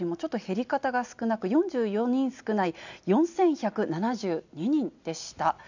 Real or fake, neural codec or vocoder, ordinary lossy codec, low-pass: real; none; none; 7.2 kHz